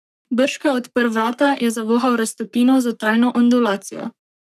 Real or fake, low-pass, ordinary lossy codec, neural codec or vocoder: fake; 14.4 kHz; none; codec, 44.1 kHz, 3.4 kbps, Pupu-Codec